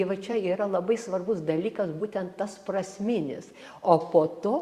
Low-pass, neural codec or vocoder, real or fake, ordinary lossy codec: 14.4 kHz; vocoder, 44.1 kHz, 128 mel bands every 512 samples, BigVGAN v2; fake; Opus, 64 kbps